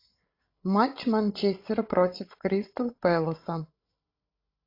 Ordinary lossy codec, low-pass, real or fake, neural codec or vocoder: AAC, 32 kbps; 5.4 kHz; fake; codec, 16 kHz, 16 kbps, FreqCodec, larger model